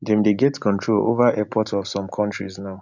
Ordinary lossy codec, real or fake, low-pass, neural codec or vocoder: none; real; 7.2 kHz; none